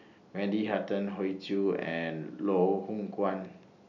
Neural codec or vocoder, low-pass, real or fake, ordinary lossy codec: none; 7.2 kHz; real; none